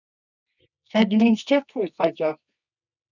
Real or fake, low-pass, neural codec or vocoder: fake; 7.2 kHz; codec, 24 kHz, 0.9 kbps, WavTokenizer, medium music audio release